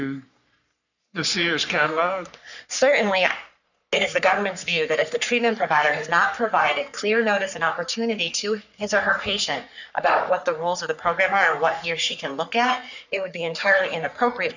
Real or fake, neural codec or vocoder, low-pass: fake; codec, 44.1 kHz, 3.4 kbps, Pupu-Codec; 7.2 kHz